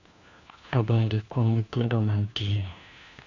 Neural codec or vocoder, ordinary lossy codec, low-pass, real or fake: codec, 16 kHz, 1 kbps, FunCodec, trained on LibriTTS, 50 frames a second; Opus, 64 kbps; 7.2 kHz; fake